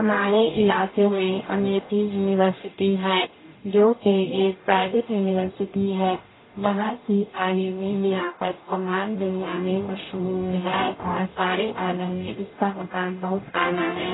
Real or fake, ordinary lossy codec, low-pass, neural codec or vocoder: fake; AAC, 16 kbps; 7.2 kHz; codec, 44.1 kHz, 0.9 kbps, DAC